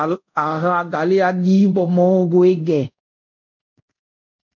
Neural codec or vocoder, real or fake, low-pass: codec, 24 kHz, 0.5 kbps, DualCodec; fake; 7.2 kHz